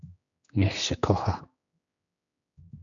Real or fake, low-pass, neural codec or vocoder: fake; 7.2 kHz; codec, 16 kHz, 2 kbps, X-Codec, HuBERT features, trained on general audio